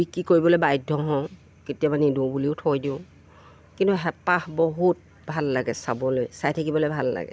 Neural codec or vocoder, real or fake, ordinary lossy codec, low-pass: none; real; none; none